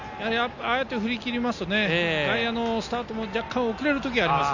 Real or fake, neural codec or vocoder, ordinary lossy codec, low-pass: real; none; Opus, 64 kbps; 7.2 kHz